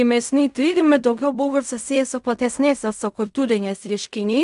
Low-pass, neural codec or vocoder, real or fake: 10.8 kHz; codec, 16 kHz in and 24 kHz out, 0.4 kbps, LongCat-Audio-Codec, fine tuned four codebook decoder; fake